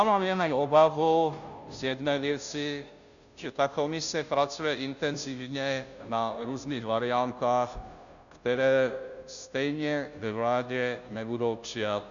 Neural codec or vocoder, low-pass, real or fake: codec, 16 kHz, 0.5 kbps, FunCodec, trained on Chinese and English, 25 frames a second; 7.2 kHz; fake